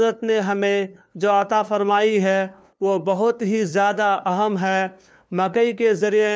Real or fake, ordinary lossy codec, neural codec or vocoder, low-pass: fake; none; codec, 16 kHz, 2 kbps, FunCodec, trained on LibriTTS, 25 frames a second; none